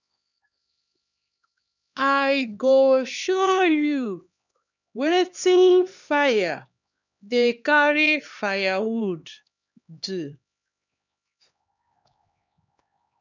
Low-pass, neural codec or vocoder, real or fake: 7.2 kHz; codec, 16 kHz, 2 kbps, X-Codec, HuBERT features, trained on LibriSpeech; fake